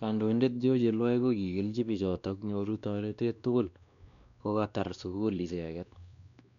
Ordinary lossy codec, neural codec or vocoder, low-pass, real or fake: none; codec, 16 kHz, 2 kbps, X-Codec, WavLM features, trained on Multilingual LibriSpeech; 7.2 kHz; fake